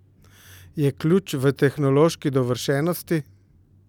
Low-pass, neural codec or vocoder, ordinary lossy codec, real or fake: 19.8 kHz; none; none; real